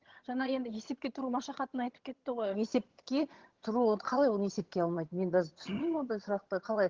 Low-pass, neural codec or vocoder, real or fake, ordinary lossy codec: 7.2 kHz; vocoder, 22.05 kHz, 80 mel bands, HiFi-GAN; fake; Opus, 16 kbps